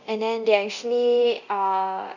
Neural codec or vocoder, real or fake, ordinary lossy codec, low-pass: codec, 24 kHz, 0.9 kbps, DualCodec; fake; none; 7.2 kHz